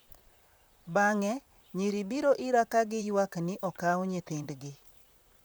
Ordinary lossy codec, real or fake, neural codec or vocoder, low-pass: none; fake; vocoder, 44.1 kHz, 128 mel bands, Pupu-Vocoder; none